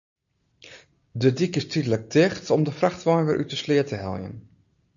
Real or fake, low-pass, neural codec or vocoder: real; 7.2 kHz; none